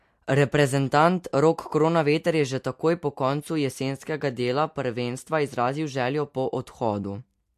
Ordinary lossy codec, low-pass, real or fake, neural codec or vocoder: MP3, 64 kbps; 14.4 kHz; real; none